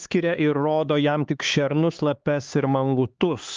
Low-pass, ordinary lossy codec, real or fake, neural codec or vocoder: 7.2 kHz; Opus, 24 kbps; fake; codec, 16 kHz, 4 kbps, X-Codec, HuBERT features, trained on LibriSpeech